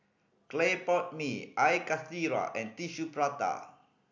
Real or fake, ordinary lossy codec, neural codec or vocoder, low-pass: real; none; none; 7.2 kHz